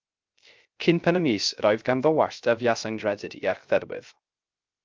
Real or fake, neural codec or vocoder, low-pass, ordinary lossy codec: fake; codec, 16 kHz, 0.3 kbps, FocalCodec; 7.2 kHz; Opus, 32 kbps